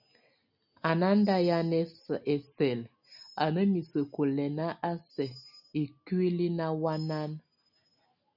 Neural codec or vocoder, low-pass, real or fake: none; 5.4 kHz; real